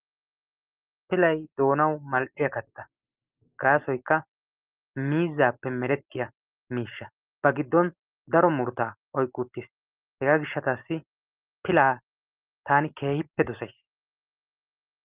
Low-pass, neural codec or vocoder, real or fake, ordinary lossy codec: 3.6 kHz; none; real; Opus, 32 kbps